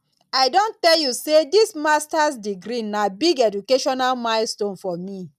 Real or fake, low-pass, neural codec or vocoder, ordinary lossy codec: real; 14.4 kHz; none; none